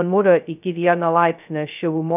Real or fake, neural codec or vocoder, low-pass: fake; codec, 16 kHz, 0.2 kbps, FocalCodec; 3.6 kHz